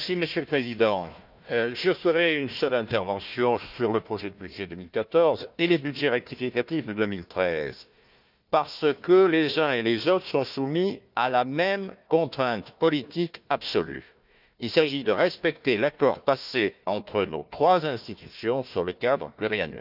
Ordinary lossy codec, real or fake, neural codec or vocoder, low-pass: none; fake; codec, 16 kHz, 1 kbps, FunCodec, trained on Chinese and English, 50 frames a second; 5.4 kHz